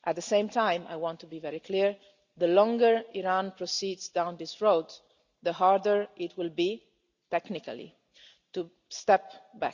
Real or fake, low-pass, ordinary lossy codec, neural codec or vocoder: real; 7.2 kHz; Opus, 64 kbps; none